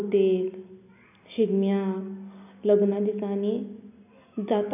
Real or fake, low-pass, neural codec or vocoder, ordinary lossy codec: real; 3.6 kHz; none; none